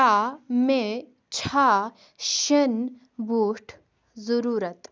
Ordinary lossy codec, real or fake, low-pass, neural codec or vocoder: none; real; none; none